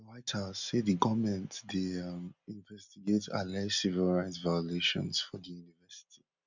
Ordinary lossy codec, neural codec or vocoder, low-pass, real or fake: none; none; 7.2 kHz; real